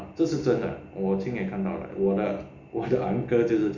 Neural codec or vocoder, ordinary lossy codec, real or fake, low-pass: none; Opus, 64 kbps; real; 7.2 kHz